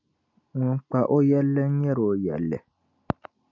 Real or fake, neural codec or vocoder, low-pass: real; none; 7.2 kHz